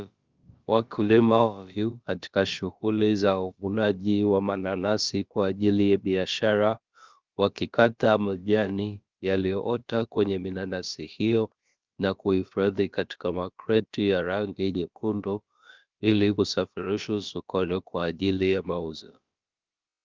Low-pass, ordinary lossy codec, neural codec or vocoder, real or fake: 7.2 kHz; Opus, 32 kbps; codec, 16 kHz, about 1 kbps, DyCAST, with the encoder's durations; fake